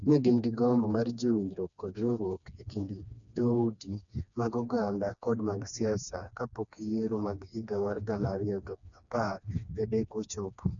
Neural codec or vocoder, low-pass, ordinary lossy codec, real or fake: codec, 16 kHz, 2 kbps, FreqCodec, smaller model; 7.2 kHz; MP3, 96 kbps; fake